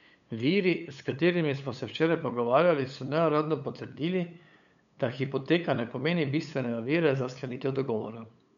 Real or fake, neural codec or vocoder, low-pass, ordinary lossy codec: fake; codec, 16 kHz, 8 kbps, FunCodec, trained on LibriTTS, 25 frames a second; 7.2 kHz; none